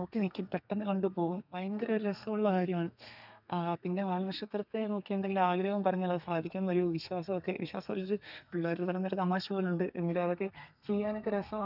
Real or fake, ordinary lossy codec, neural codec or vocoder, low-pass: fake; none; codec, 32 kHz, 1.9 kbps, SNAC; 5.4 kHz